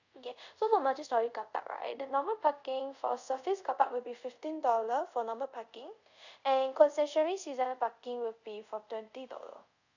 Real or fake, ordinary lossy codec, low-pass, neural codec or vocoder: fake; none; 7.2 kHz; codec, 24 kHz, 0.5 kbps, DualCodec